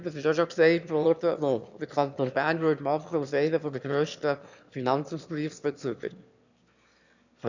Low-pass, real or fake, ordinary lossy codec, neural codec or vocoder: 7.2 kHz; fake; none; autoencoder, 22.05 kHz, a latent of 192 numbers a frame, VITS, trained on one speaker